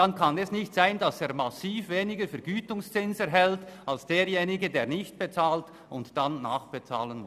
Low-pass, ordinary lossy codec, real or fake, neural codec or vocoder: 14.4 kHz; none; real; none